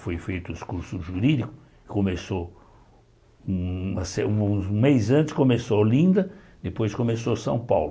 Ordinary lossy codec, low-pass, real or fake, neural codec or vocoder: none; none; real; none